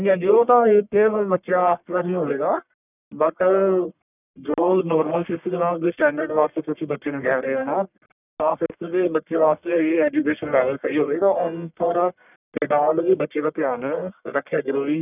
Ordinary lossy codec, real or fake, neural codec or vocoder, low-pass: none; fake; codec, 44.1 kHz, 1.7 kbps, Pupu-Codec; 3.6 kHz